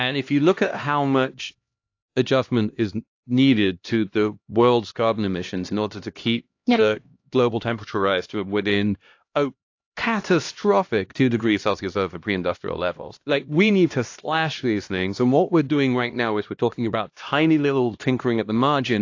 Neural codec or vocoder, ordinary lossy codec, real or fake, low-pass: codec, 16 kHz, 1 kbps, X-Codec, HuBERT features, trained on LibriSpeech; AAC, 48 kbps; fake; 7.2 kHz